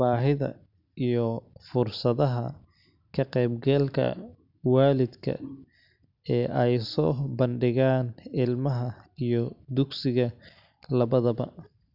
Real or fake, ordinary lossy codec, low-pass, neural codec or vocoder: real; none; 5.4 kHz; none